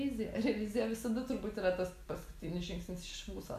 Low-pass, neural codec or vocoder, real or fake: 14.4 kHz; none; real